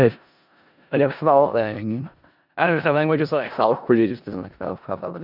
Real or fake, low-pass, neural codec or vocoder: fake; 5.4 kHz; codec, 16 kHz in and 24 kHz out, 0.4 kbps, LongCat-Audio-Codec, four codebook decoder